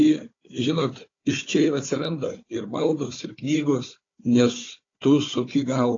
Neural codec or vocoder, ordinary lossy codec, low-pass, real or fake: codec, 16 kHz, 4 kbps, FunCodec, trained on Chinese and English, 50 frames a second; AAC, 32 kbps; 7.2 kHz; fake